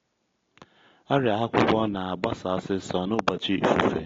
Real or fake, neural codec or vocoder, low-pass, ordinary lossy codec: real; none; 7.2 kHz; AAC, 32 kbps